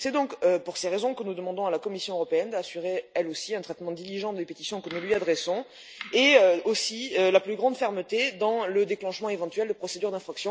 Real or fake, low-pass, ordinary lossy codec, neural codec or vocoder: real; none; none; none